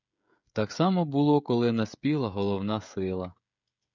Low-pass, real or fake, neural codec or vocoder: 7.2 kHz; fake; codec, 16 kHz, 16 kbps, FreqCodec, smaller model